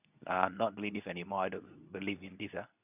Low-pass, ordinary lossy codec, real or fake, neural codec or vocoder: 3.6 kHz; none; fake; codec, 24 kHz, 0.9 kbps, WavTokenizer, medium speech release version 2